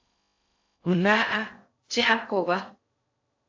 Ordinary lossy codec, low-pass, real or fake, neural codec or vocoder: AAC, 48 kbps; 7.2 kHz; fake; codec, 16 kHz in and 24 kHz out, 0.6 kbps, FocalCodec, streaming, 4096 codes